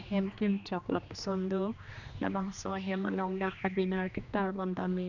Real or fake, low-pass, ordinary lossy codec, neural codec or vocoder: fake; 7.2 kHz; none; codec, 16 kHz, 2 kbps, X-Codec, HuBERT features, trained on general audio